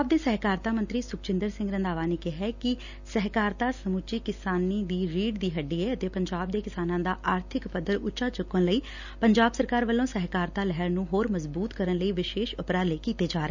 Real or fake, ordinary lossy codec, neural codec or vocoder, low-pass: real; none; none; 7.2 kHz